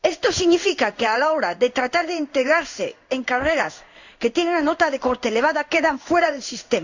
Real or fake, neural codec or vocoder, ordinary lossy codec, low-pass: fake; codec, 16 kHz in and 24 kHz out, 1 kbps, XY-Tokenizer; none; 7.2 kHz